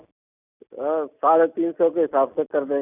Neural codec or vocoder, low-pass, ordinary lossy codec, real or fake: none; 3.6 kHz; none; real